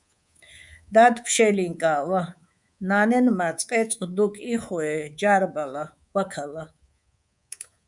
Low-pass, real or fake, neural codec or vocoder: 10.8 kHz; fake; codec, 24 kHz, 3.1 kbps, DualCodec